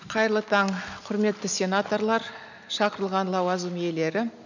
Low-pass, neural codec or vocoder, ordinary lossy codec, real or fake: 7.2 kHz; none; none; real